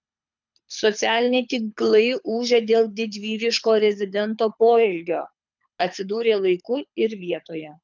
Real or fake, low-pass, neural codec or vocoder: fake; 7.2 kHz; codec, 24 kHz, 6 kbps, HILCodec